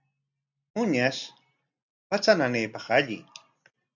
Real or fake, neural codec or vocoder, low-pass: real; none; 7.2 kHz